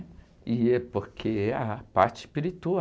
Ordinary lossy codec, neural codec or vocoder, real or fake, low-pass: none; none; real; none